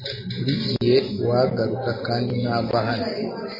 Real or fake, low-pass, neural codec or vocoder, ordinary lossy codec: real; 5.4 kHz; none; MP3, 24 kbps